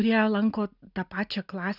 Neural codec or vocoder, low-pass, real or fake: none; 5.4 kHz; real